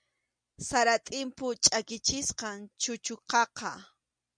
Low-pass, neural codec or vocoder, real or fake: 9.9 kHz; none; real